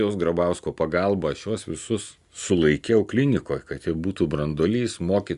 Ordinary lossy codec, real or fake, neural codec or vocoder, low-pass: Opus, 64 kbps; real; none; 10.8 kHz